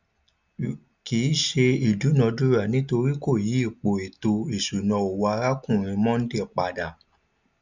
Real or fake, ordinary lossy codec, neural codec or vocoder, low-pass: real; none; none; 7.2 kHz